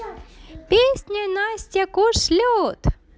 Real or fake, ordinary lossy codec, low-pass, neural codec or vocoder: real; none; none; none